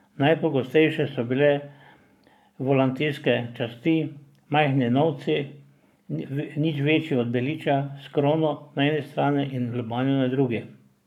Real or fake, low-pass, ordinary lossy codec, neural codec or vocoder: fake; 19.8 kHz; none; codec, 44.1 kHz, 7.8 kbps, Pupu-Codec